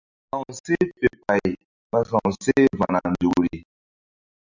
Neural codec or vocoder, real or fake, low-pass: none; real; 7.2 kHz